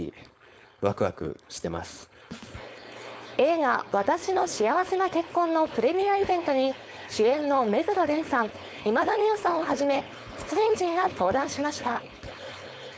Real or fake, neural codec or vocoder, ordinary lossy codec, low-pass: fake; codec, 16 kHz, 4.8 kbps, FACodec; none; none